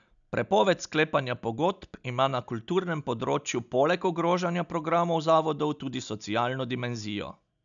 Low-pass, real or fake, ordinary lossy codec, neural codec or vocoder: 7.2 kHz; real; none; none